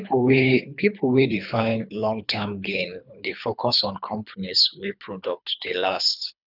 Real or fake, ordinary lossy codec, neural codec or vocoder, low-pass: fake; none; codec, 24 kHz, 3 kbps, HILCodec; 5.4 kHz